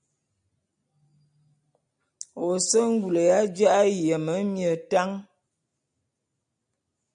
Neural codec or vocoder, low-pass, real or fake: none; 9.9 kHz; real